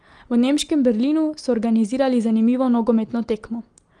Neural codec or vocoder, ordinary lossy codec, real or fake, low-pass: none; Opus, 24 kbps; real; 9.9 kHz